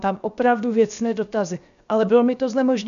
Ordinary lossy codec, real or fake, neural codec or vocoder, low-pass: AAC, 96 kbps; fake; codec, 16 kHz, 0.7 kbps, FocalCodec; 7.2 kHz